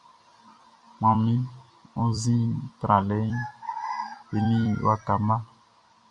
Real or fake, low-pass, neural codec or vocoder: fake; 10.8 kHz; vocoder, 44.1 kHz, 128 mel bands every 256 samples, BigVGAN v2